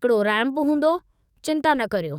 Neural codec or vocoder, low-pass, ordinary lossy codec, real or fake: codec, 44.1 kHz, 7.8 kbps, DAC; 19.8 kHz; none; fake